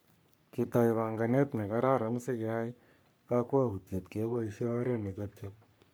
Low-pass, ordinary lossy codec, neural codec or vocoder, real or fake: none; none; codec, 44.1 kHz, 3.4 kbps, Pupu-Codec; fake